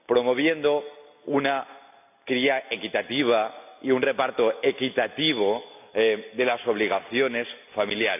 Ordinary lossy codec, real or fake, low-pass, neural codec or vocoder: none; real; 3.6 kHz; none